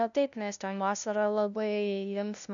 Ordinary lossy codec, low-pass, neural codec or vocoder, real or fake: MP3, 96 kbps; 7.2 kHz; codec, 16 kHz, 0.5 kbps, FunCodec, trained on LibriTTS, 25 frames a second; fake